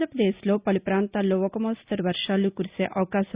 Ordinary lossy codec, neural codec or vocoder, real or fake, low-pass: Opus, 64 kbps; none; real; 3.6 kHz